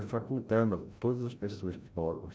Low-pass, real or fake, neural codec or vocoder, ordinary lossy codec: none; fake; codec, 16 kHz, 0.5 kbps, FreqCodec, larger model; none